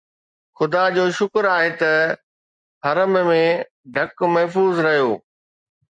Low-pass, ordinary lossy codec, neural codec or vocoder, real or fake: 9.9 kHz; MP3, 64 kbps; none; real